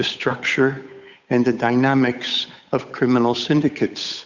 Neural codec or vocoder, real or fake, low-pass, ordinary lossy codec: codec, 16 kHz, 8 kbps, FunCodec, trained on Chinese and English, 25 frames a second; fake; 7.2 kHz; Opus, 64 kbps